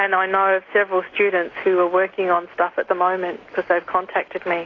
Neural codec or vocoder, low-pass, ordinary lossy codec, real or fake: none; 7.2 kHz; AAC, 32 kbps; real